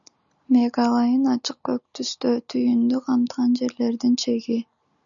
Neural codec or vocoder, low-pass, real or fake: none; 7.2 kHz; real